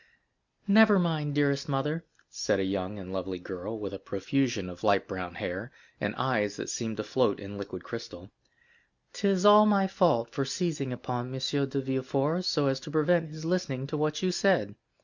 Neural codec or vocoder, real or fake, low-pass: none; real; 7.2 kHz